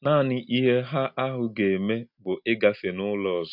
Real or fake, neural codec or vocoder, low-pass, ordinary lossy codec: real; none; 5.4 kHz; none